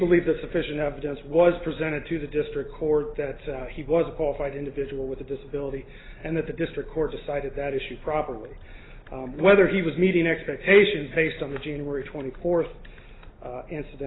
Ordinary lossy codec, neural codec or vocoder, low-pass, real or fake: AAC, 16 kbps; none; 7.2 kHz; real